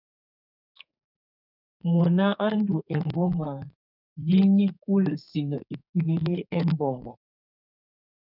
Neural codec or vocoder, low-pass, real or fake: codec, 32 kHz, 1.9 kbps, SNAC; 5.4 kHz; fake